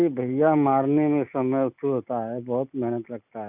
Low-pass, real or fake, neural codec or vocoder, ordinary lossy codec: 3.6 kHz; real; none; none